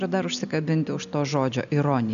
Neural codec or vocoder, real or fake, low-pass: none; real; 7.2 kHz